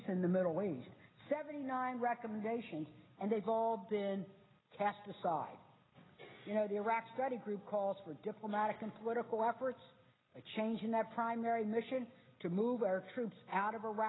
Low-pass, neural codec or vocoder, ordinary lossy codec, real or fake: 7.2 kHz; none; AAC, 16 kbps; real